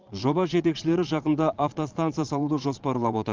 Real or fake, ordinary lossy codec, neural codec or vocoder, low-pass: fake; Opus, 32 kbps; vocoder, 22.05 kHz, 80 mel bands, Vocos; 7.2 kHz